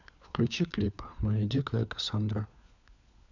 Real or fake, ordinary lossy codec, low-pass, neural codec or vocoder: fake; none; 7.2 kHz; codec, 16 kHz, 4 kbps, FunCodec, trained on LibriTTS, 50 frames a second